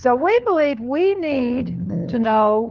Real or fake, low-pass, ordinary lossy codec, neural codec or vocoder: fake; 7.2 kHz; Opus, 32 kbps; codec, 16 kHz, 4 kbps, FunCodec, trained on LibriTTS, 50 frames a second